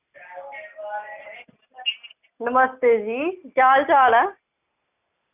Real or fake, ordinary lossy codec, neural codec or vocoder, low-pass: real; none; none; 3.6 kHz